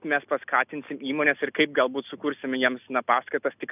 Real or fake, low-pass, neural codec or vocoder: real; 3.6 kHz; none